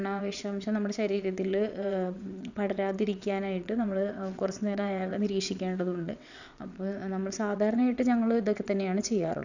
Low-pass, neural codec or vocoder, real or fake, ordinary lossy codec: 7.2 kHz; vocoder, 22.05 kHz, 80 mel bands, WaveNeXt; fake; none